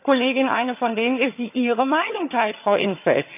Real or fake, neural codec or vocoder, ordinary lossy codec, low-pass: fake; vocoder, 22.05 kHz, 80 mel bands, HiFi-GAN; none; 3.6 kHz